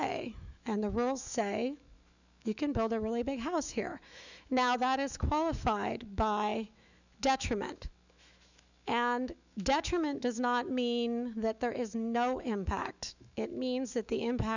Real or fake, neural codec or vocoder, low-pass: fake; autoencoder, 48 kHz, 128 numbers a frame, DAC-VAE, trained on Japanese speech; 7.2 kHz